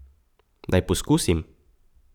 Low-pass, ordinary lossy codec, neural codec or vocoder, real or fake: 19.8 kHz; none; vocoder, 44.1 kHz, 128 mel bands every 512 samples, BigVGAN v2; fake